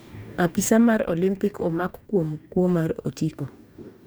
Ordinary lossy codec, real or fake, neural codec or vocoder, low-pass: none; fake; codec, 44.1 kHz, 2.6 kbps, DAC; none